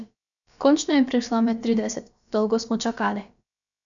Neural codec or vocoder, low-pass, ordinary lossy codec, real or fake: codec, 16 kHz, about 1 kbps, DyCAST, with the encoder's durations; 7.2 kHz; none; fake